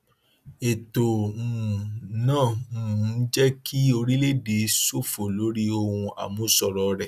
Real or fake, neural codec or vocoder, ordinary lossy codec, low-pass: real; none; none; 14.4 kHz